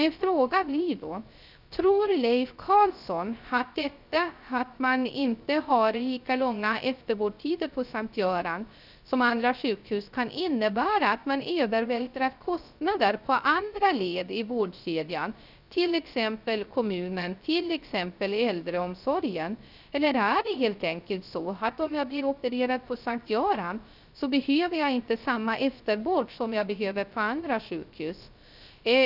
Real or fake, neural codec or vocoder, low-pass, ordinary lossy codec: fake; codec, 16 kHz, 0.3 kbps, FocalCodec; 5.4 kHz; none